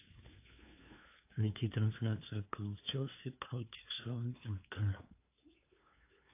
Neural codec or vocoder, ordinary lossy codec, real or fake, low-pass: codec, 16 kHz, 2 kbps, FunCodec, trained on LibriTTS, 25 frames a second; AAC, 24 kbps; fake; 3.6 kHz